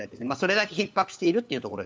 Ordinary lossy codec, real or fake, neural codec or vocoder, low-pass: none; fake; codec, 16 kHz, 4 kbps, FunCodec, trained on LibriTTS, 50 frames a second; none